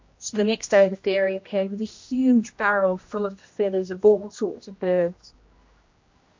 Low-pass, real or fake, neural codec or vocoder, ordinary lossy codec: 7.2 kHz; fake; codec, 16 kHz, 1 kbps, X-Codec, HuBERT features, trained on general audio; MP3, 48 kbps